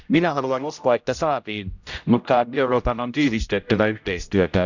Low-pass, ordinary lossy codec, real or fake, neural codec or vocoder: 7.2 kHz; none; fake; codec, 16 kHz, 0.5 kbps, X-Codec, HuBERT features, trained on general audio